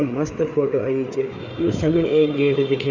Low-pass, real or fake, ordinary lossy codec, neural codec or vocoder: 7.2 kHz; fake; none; codec, 16 kHz, 4 kbps, FreqCodec, larger model